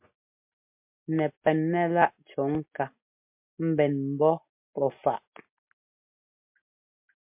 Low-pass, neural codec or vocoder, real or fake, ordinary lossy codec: 3.6 kHz; none; real; MP3, 32 kbps